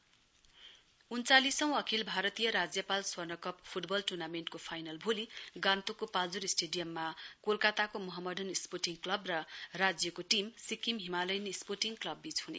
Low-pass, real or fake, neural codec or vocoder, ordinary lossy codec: none; real; none; none